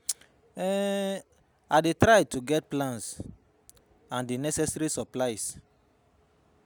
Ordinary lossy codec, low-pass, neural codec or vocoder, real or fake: none; none; none; real